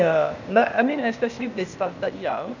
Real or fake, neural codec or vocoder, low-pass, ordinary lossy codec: fake; codec, 16 kHz, 0.8 kbps, ZipCodec; 7.2 kHz; none